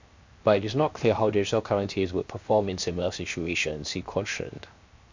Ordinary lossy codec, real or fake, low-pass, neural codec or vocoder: MP3, 64 kbps; fake; 7.2 kHz; codec, 16 kHz, 0.7 kbps, FocalCodec